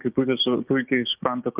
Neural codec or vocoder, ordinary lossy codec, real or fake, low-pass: codec, 16 kHz, 6 kbps, DAC; Opus, 32 kbps; fake; 3.6 kHz